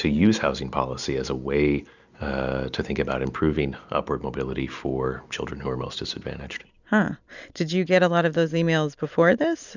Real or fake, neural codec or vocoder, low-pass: real; none; 7.2 kHz